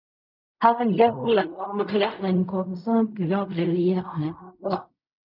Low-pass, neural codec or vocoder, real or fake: 5.4 kHz; codec, 16 kHz in and 24 kHz out, 0.4 kbps, LongCat-Audio-Codec, fine tuned four codebook decoder; fake